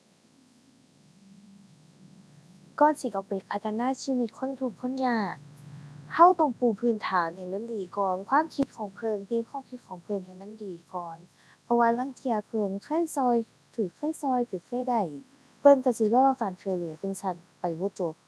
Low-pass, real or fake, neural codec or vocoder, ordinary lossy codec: none; fake; codec, 24 kHz, 0.9 kbps, WavTokenizer, large speech release; none